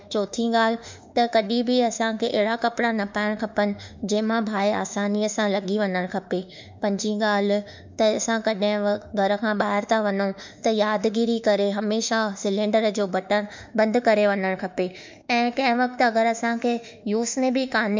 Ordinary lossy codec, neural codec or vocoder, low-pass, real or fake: MP3, 64 kbps; autoencoder, 48 kHz, 32 numbers a frame, DAC-VAE, trained on Japanese speech; 7.2 kHz; fake